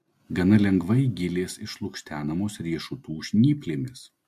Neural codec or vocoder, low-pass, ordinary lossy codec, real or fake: none; 14.4 kHz; MP3, 64 kbps; real